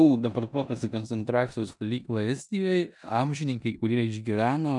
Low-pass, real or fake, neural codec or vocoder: 10.8 kHz; fake; codec, 16 kHz in and 24 kHz out, 0.9 kbps, LongCat-Audio-Codec, four codebook decoder